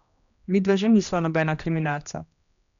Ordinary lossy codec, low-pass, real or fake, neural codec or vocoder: none; 7.2 kHz; fake; codec, 16 kHz, 1 kbps, X-Codec, HuBERT features, trained on general audio